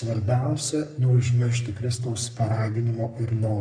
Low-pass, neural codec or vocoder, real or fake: 9.9 kHz; codec, 44.1 kHz, 3.4 kbps, Pupu-Codec; fake